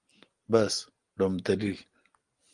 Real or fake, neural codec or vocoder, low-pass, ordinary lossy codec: real; none; 9.9 kHz; Opus, 24 kbps